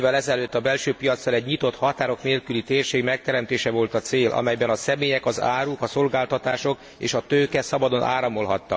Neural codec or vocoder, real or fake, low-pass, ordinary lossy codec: none; real; none; none